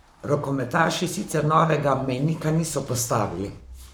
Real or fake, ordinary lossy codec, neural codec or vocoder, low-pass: fake; none; codec, 44.1 kHz, 7.8 kbps, Pupu-Codec; none